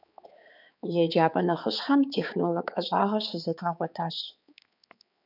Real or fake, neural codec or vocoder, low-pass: fake; codec, 16 kHz, 4 kbps, X-Codec, HuBERT features, trained on balanced general audio; 5.4 kHz